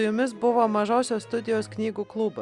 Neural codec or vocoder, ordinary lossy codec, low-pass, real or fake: none; Opus, 64 kbps; 10.8 kHz; real